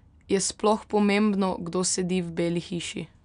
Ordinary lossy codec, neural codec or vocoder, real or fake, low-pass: none; none; real; 9.9 kHz